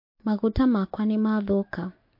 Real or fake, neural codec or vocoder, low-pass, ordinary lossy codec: real; none; 5.4 kHz; MP3, 32 kbps